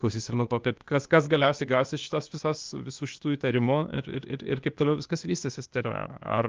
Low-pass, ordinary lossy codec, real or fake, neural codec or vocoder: 7.2 kHz; Opus, 24 kbps; fake; codec, 16 kHz, 0.8 kbps, ZipCodec